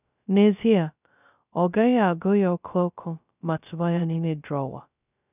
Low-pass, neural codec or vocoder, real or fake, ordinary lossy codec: 3.6 kHz; codec, 16 kHz, 0.2 kbps, FocalCodec; fake; none